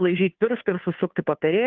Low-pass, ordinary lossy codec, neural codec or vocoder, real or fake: 7.2 kHz; Opus, 24 kbps; codec, 16 kHz, 2 kbps, FunCodec, trained on Chinese and English, 25 frames a second; fake